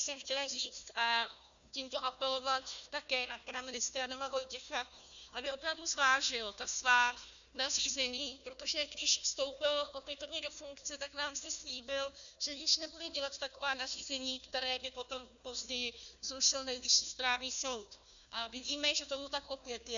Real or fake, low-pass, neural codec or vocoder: fake; 7.2 kHz; codec, 16 kHz, 1 kbps, FunCodec, trained on Chinese and English, 50 frames a second